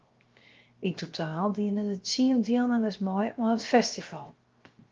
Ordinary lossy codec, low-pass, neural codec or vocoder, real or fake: Opus, 32 kbps; 7.2 kHz; codec, 16 kHz, 0.7 kbps, FocalCodec; fake